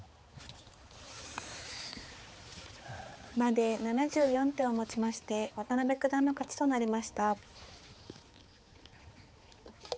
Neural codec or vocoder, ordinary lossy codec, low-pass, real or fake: codec, 16 kHz, 4 kbps, X-Codec, HuBERT features, trained on balanced general audio; none; none; fake